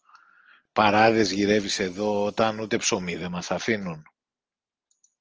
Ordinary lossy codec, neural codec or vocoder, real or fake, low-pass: Opus, 24 kbps; none; real; 7.2 kHz